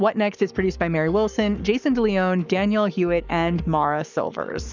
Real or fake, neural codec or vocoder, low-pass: fake; codec, 44.1 kHz, 7.8 kbps, Pupu-Codec; 7.2 kHz